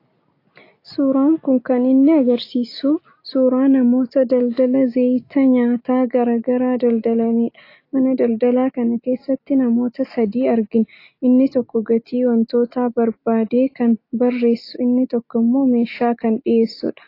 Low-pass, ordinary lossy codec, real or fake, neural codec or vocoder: 5.4 kHz; AAC, 32 kbps; real; none